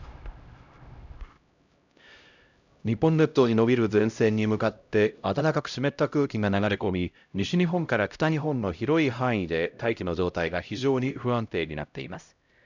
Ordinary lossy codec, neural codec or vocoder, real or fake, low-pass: none; codec, 16 kHz, 0.5 kbps, X-Codec, HuBERT features, trained on LibriSpeech; fake; 7.2 kHz